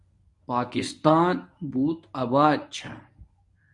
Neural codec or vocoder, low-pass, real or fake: codec, 24 kHz, 0.9 kbps, WavTokenizer, medium speech release version 1; 10.8 kHz; fake